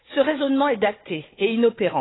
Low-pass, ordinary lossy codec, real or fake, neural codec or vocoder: 7.2 kHz; AAC, 16 kbps; fake; codec, 16 kHz, 4 kbps, FunCodec, trained on Chinese and English, 50 frames a second